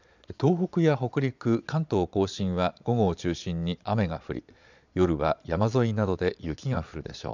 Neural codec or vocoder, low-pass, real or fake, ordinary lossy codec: vocoder, 22.05 kHz, 80 mel bands, Vocos; 7.2 kHz; fake; none